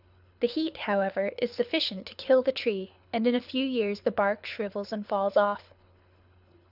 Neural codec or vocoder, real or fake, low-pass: codec, 24 kHz, 6 kbps, HILCodec; fake; 5.4 kHz